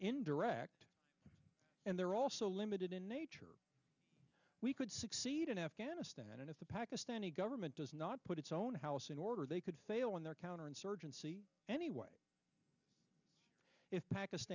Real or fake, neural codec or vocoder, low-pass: real; none; 7.2 kHz